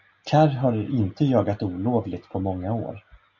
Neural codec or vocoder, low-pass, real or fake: none; 7.2 kHz; real